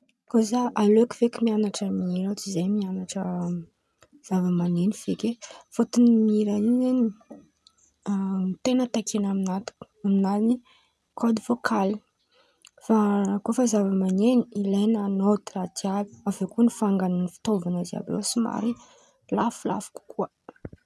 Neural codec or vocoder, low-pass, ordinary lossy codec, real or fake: none; none; none; real